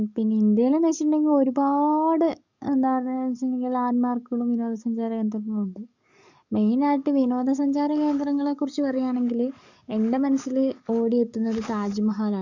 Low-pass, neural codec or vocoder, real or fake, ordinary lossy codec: 7.2 kHz; codec, 44.1 kHz, 7.8 kbps, DAC; fake; none